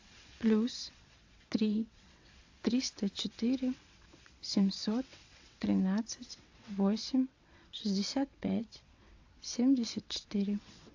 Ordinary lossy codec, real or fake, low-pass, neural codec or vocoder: AAC, 48 kbps; fake; 7.2 kHz; vocoder, 22.05 kHz, 80 mel bands, WaveNeXt